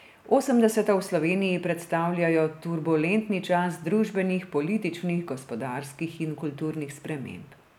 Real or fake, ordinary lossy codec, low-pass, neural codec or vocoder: real; none; 19.8 kHz; none